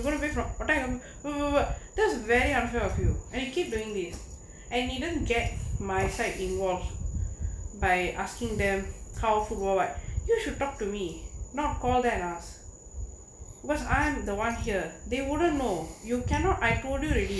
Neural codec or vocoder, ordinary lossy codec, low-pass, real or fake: none; none; none; real